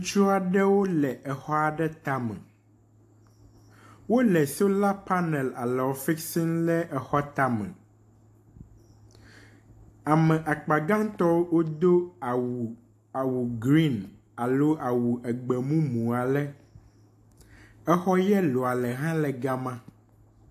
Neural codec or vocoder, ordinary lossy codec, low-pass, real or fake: none; AAC, 64 kbps; 14.4 kHz; real